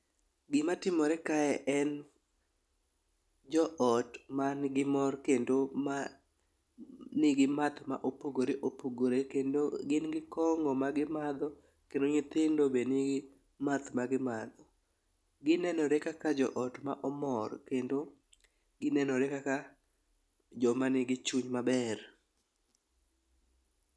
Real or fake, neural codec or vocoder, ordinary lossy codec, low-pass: real; none; none; none